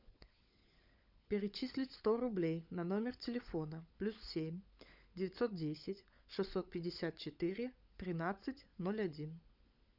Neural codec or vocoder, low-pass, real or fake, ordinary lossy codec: codec, 16 kHz, 16 kbps, FunCodec, trained on LibriTTS, 50 frames a second; 5.4 kHz; fake; Opus, 64 kbps